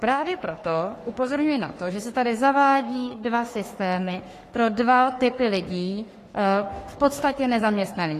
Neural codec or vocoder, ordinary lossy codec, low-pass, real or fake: codec, 44.1 kHz, 3.4 kbps, Pupu-Codec; AAC, 48 kbps; 14.4 kHz; fake